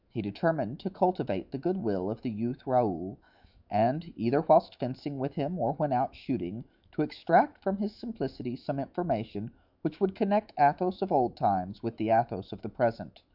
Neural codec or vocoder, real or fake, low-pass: autoencoder, 48 kHz, 128 numbers a frame, DAC-VAE, trained on Japanese speech; fake; 5.4 kHz